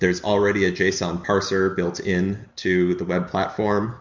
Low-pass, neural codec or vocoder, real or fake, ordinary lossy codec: 7.2 kHz; none; real; MP3, 48 kbps